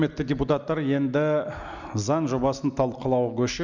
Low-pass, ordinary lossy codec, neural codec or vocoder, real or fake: 7.2 kHz; Opus, 64 kbps; none; real